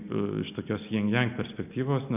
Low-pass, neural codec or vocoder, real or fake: 3.6 kHz; none; real